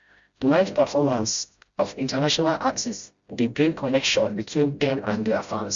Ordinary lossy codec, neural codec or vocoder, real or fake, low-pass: Opus, 64 kbps; codec, 16 kHz, 0.5 kbps, FreqCodec, smaller model; fake; 7.2 kHz